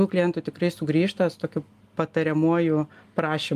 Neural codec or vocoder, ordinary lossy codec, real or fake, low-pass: none; Opus, 32 kbps; real; 14.4 kHz